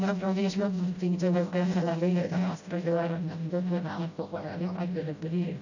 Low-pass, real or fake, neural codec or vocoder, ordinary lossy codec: 7.2 kHz; fake; codec, 16 kHz, 0.5 kbps, FreqCodec, smaller model; none